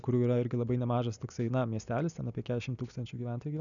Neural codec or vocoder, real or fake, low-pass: none; real; 7.2 kHz